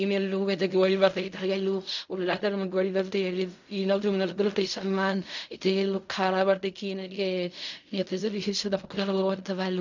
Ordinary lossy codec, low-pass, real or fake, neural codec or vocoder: none; 7.2 kHz; fake; codec, 16 kHz in and 24 kHz out, 0.4 kbps, LongCat-Audio-Codec, fine tuned four codebook decoder